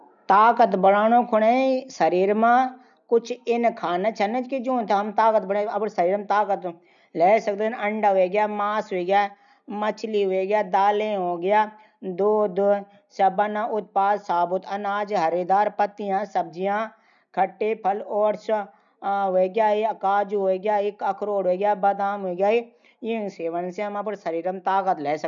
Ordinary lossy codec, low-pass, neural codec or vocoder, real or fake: none; 7.2 kHz; none; real